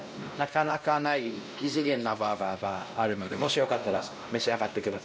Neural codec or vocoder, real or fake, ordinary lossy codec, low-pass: codec, 16 kHz, 1 kbps, X-Codec, WavLM features, trained on Multilingual LibriSpeech; fake; none; none